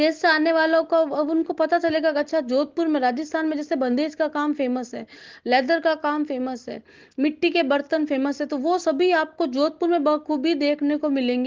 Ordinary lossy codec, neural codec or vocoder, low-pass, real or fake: Opus, 16 kbps; none; 7.2 kHz; real